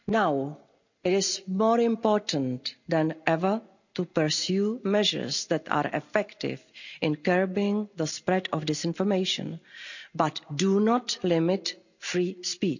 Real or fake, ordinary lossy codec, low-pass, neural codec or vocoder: real; none; 7.2 kHz; none